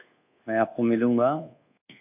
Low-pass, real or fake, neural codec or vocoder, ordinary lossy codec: 3.6 kHz; fake; codec, 24 kHz, 1.2 kbps, DualCodec; AAC, 32 kbps